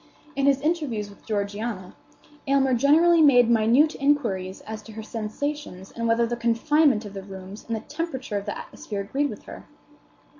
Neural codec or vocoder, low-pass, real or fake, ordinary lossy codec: none; 7.2 kHz; real; MP3, 48 kbps